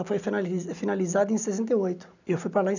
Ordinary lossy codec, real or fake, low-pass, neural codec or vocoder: none; real; 7.2 kHz; none